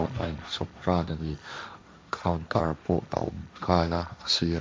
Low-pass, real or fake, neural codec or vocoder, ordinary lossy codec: none; fake; codec, 16 kHz, 1.1 kbps, Voila-Tokenizer; none